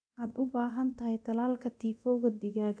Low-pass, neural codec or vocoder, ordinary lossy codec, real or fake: 9.9 kHz; codec, 24 kHz, 0.9 kbps, DualCodec; none; fake